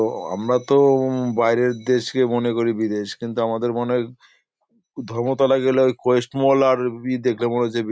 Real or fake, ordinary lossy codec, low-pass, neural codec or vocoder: real; none; none; none